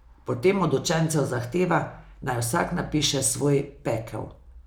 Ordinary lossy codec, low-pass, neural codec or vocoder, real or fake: none; none; none; real